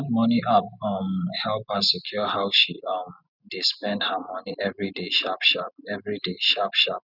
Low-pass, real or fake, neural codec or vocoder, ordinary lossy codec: 5.4 kHz; real; none; none